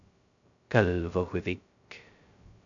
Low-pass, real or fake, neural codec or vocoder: 7.2 kHz; fake; codec, 16 kHz, 0.2 kbps, FocalCodec